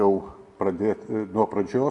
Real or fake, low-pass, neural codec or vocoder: real; 10.8 kHz; none